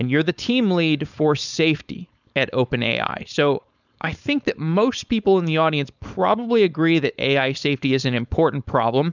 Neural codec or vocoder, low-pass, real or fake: codec, 16 kHz, 4.8 kbps, FACodec; 7.2 kHz; fake